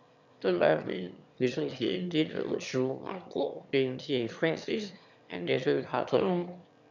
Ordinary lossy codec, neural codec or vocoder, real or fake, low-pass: none; autoencoder, 22.05 kHz, a latent of 192 numbers a frame, VITS, trained on one speaker; fake; 7.2 kHz